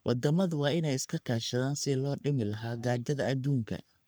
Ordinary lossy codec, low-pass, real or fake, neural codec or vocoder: none; none; fake; codec, 44.1 kHz, 3.4 kbps, Pupu-Codec